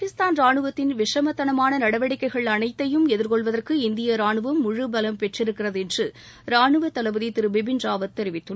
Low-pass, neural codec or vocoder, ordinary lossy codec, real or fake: none; none; none; real